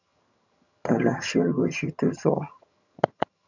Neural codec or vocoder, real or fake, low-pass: vocoder, 22.05 kHz, 80 mel bands, HiFi-GAN; fake; 7.2 kHz